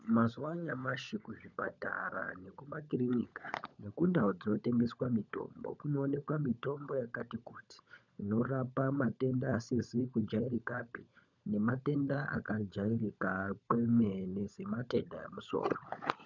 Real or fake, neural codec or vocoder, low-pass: fake; codec, 16 kHz, 16 kbps, FunCodec, trained on LibriTTS, 50 frames a second; 7.2 kHz